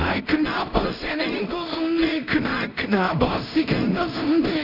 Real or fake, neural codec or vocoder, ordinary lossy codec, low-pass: fake; codec, 16 kHz in and 24 kHz out, 0.4 kbps, LongCat-Audio-Codec, two codebook decoder; none; 5.4 kHz